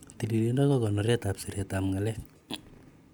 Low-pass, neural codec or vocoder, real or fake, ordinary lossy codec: none; none; real; none